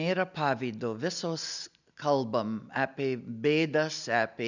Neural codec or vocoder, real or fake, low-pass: none; real; 7.2 kHz